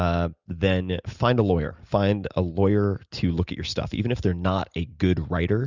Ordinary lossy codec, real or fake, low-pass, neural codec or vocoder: Opus, 64 kbps; real; 7.2 kHz; none